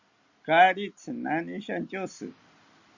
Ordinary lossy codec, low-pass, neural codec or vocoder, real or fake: Opus, 64 kbps; 7.2 kHz; none; real